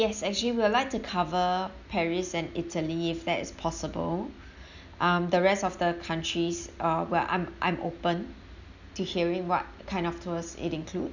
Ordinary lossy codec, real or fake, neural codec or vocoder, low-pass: none; real; none; 7.2 kHz